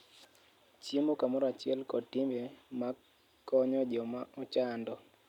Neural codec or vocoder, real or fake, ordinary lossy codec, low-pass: none; real; none; 19.8 kHz